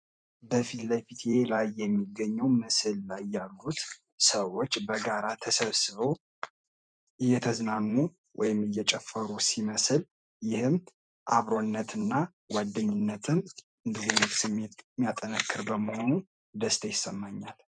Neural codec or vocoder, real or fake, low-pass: vocoder, 44.1 kHz, 128 mel bands every 512 samples, BigVGAN v2; fake; 9.9 kHz